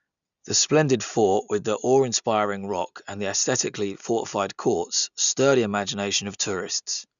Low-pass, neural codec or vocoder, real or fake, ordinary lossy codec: 7.2 kHz; none; real; none